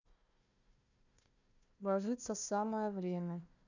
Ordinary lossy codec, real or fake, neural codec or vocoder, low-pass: none; fake; codec, 16 kHz, 1 kbps, FunCodec, trained on Chinese and English, 50 frames a second; 7.2 kHz